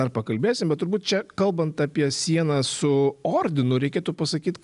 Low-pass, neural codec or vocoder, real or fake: 10.8 kHz; none; real